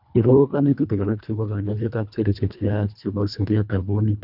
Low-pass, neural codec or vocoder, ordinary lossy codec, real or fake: 5.4 kHz; codec, 24 kHz, 1.5 kbps, HILCodec; none; fake